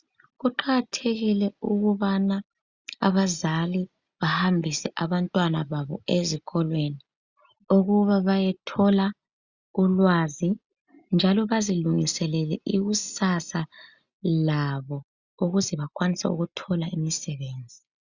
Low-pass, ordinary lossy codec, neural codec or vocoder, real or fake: 7.2 kHz; Opus, 64 kbps; none; real